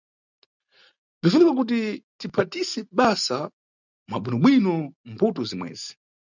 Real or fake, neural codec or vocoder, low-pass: real; none; 7.2 kHz